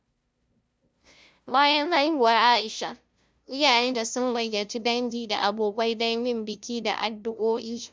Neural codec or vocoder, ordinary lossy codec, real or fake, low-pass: codec, 16 kHz, 0.5 kbps, FunCodec, trained on LibriTTS, 25 frames a second; none; fake; none